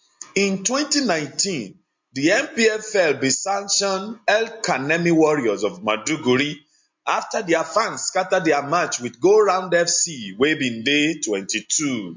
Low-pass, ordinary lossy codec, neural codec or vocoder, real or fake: 7.2 kHz; MP3, 48 kbps; none; real